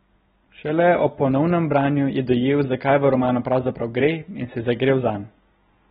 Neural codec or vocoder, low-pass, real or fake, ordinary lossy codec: none; 7.2 kHz; real; AAC, 16 kbps